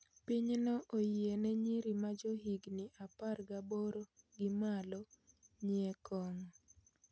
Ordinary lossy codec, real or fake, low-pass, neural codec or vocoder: none; real; none; none